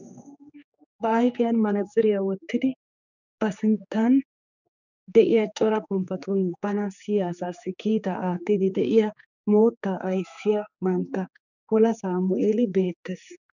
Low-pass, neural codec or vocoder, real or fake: 7.2 kHz; codec, 16 kHz, 4 kbps, X-Codec, HuBERT features, trained on general audio; fake